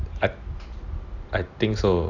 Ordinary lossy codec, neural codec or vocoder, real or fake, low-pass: none; none; real; 7.2 kHz